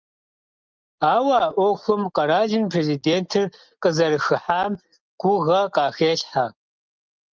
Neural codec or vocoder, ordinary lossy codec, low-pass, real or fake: none; Opus, 24 kbps; 7.2 kHz; real